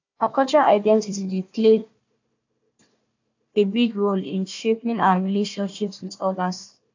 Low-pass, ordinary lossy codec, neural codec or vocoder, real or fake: 7.2 kHz; AAC, 48 kbps; codec, 16 kHz, 1 kbps, FunCodec, trained on Chinese and English, 50 frames a second; fake